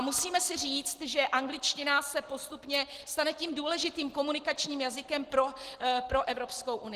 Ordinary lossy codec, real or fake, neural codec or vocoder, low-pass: Opus, 16 kbps; real; none; 14.4 kHz